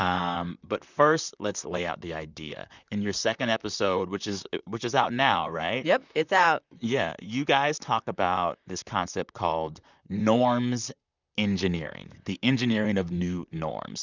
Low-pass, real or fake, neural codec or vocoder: 7.2 kHz; fake; vocoder, 44.1 kHz, 128 mel bands, Pupu-Vocoder